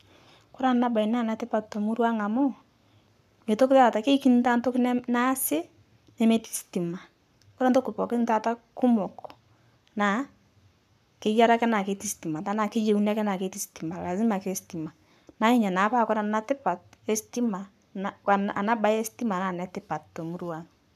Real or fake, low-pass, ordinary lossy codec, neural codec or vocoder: fake; 14.4 kHz; none; codec, 44.1 kHz, 7.8 kbps, Pupu-Codec